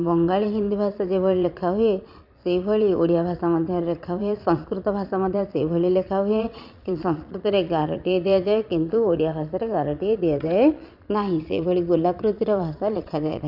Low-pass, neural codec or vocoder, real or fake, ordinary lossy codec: 5.4 kHz; vocoder, 22.05 kHz, 80 mel bands, Vocos; fake; none